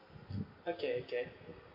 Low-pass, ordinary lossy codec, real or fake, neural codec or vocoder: 5.4 kHz; none; real; none